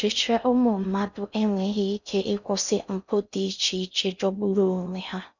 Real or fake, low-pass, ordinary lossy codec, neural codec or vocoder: fake; 7.2 kHz; none; codec, 16 kHz in and 24 kHz out, 0.6 kbps, FocalCodec, streaming, 4096 codes